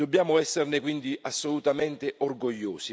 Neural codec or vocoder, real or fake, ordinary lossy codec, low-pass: none; real; none; none